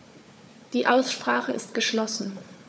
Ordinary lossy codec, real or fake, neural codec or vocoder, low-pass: none; fake; codec, 16 kHz, 4 kbps, FunCodec, trained on Chinese and English, 50 frames a second; none